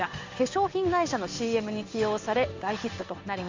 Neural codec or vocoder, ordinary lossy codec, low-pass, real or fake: codec, 16 kHz in and 24 kHz out, 1 kbps, XY-Tokenizer; MP3, 64 kbps; 7.2 kHz; fake